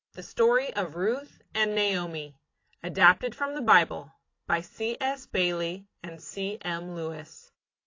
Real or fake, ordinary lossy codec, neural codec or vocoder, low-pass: real; AAC, 32 kbps; none; 7.2 kHz